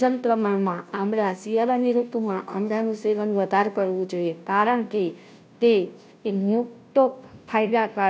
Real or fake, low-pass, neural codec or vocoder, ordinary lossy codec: fake; none; codec, 16 kHz, 0.5 kbps, FunCodec, trained on Chinese and English, 25 frames a second; none